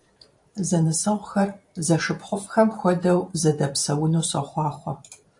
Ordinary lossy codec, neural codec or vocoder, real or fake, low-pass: AAC, 64 kbps; vocoder, 44.1 kHz, 128 mel bands every 512 samples, BigVGAN v2; fake; 10.8 kHz